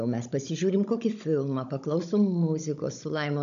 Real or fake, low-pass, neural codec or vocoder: fake; 7.2 kHz; codec, 16 kHz, 16 kbps, FunCodec, trained on Chinese and English, 50 frames a second